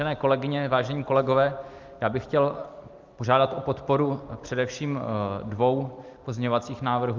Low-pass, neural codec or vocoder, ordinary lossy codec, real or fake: 7.2 kHz; none; Opus, 24 kbps; real